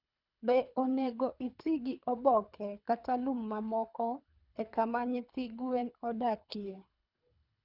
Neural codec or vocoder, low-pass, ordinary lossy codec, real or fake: codec, 24 kHz, 3 kbps, HILCodec; 5.4 kHz; none; fake